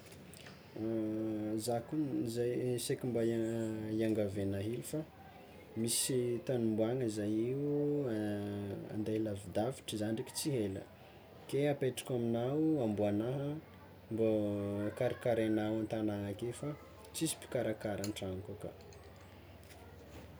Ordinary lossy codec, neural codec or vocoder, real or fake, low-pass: none; none; real; none